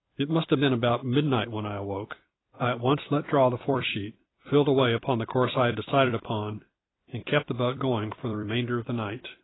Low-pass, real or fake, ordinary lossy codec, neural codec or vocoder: 7.2 kHz; fake; AAC, 16 kbps; vocoder, 44.1 kHz, 128 mel bands every 256 samples, BigVGAN v2